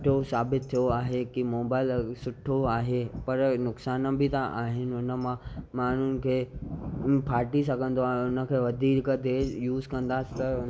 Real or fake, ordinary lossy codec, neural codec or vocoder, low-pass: real; none; none; none